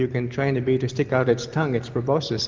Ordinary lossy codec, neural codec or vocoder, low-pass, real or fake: Opus, 24 kbps; none; 7.2 kHz; real